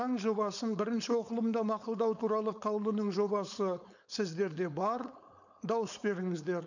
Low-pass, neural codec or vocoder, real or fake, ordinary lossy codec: 7.2 kHz; codec, 16 kHz, 4.8 kbps, FACodec; fake; none